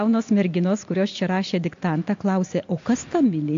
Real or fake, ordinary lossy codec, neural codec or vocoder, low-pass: real; AAC, 48 kbps; none; 7.2 kHz